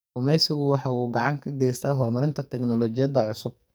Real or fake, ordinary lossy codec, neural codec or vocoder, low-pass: fake; none; codec, 44.1 kHz, 2.6 kbps, SNAC; none